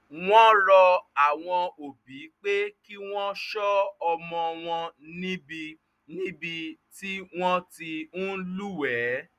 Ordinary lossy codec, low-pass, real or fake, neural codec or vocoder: none; 14.4 kHz; real; none